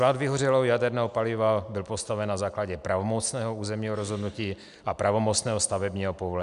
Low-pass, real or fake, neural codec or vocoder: 10.8 kHz; real; none